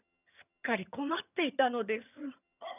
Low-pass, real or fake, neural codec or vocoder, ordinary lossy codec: 3.6 kHz; fake; vocoder, 22.05 kHz, 80 mel bands, HiFi-GAN; none